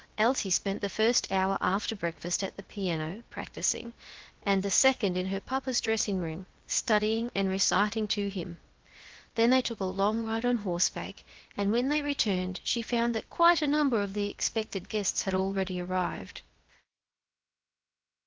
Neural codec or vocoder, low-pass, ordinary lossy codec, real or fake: codec, 16 kHz, about 1 kbps, DyCAST, with the encoder's durations; 7.2 kHz; Opus, 16 kbps; fake